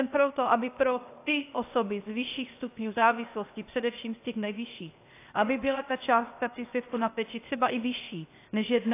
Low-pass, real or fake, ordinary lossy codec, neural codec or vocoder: 3.6 kHz; fake; AAC, 24 kbps; codec, 16 kHz, 0.8 kbps, ZipCodec